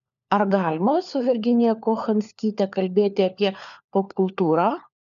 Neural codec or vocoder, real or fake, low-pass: codec, 16 kHz, 4 kbps, FunCodec, trained on LibriTTS, 50 frames a second; fake; 7.2 kHz